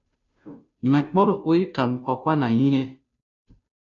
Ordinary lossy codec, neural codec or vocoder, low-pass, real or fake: MP3, 64 kbps; codec, 16 kHz, 0.5 kbps, FunCodec, trained on Chinese and English, 25 frames a second; 7.2 kHz; fake